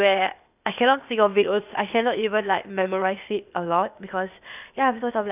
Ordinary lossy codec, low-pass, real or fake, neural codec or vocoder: none; 3.6 kHz; fake; codec, 16 kHz, 0.8 kbps, ZipCodec